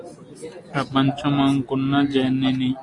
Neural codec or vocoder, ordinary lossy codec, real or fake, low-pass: none; AAC, 48 kbps; real; 10.8 kHz